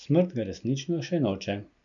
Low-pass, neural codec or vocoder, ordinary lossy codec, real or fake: 7.2 kHz; none; none; real